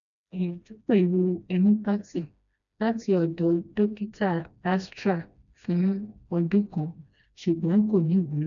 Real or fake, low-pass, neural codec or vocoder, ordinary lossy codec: fake; 7.2 kHz; codec, 16 kHz, 1 kbps, FreqCodec, smaller model; none